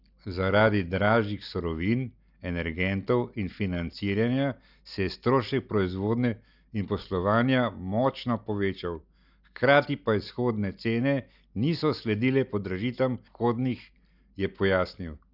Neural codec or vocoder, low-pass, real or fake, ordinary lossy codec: none; 5.4 kHz; real; none